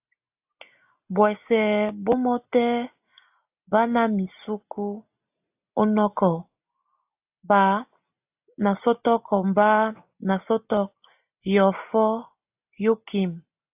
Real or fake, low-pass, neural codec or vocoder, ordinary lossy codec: real; 3.6 kHz; none; AAC, 32 kbps